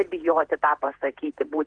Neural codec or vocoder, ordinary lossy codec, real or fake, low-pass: vocoder, 48 kHz, 128 mel bands, Vocos; Opus, 16 kbps; fake; 9.9 kHz